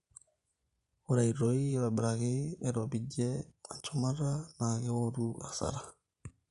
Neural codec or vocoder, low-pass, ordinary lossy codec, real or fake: vocoder, 24 kHz, 100 mel bands, Vocos; 10.8 kHz; none; fake